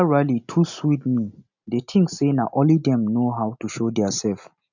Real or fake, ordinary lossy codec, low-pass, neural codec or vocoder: real; none; 7.2 kHz; none